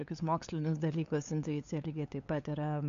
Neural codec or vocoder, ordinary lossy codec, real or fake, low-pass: codec, 16 kHz, 8 kbps, FunCodec, trained on LibriTTS, 25 frames a second; AAC, 48 kbps; fake; 7.2 kHz